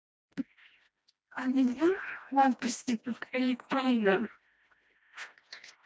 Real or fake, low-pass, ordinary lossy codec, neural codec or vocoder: fake; none; none; codec, 16 kHz, 1 kbps, FreqCodec, smaller model